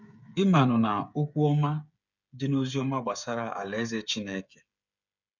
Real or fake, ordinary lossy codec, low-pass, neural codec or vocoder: fake; none; 7.2 kHz; codec, 16 kHz, 8 kbps, FreqCodec, smaller model